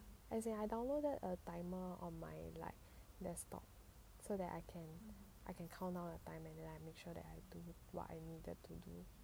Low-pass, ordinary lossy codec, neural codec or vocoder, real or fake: none; none; none; real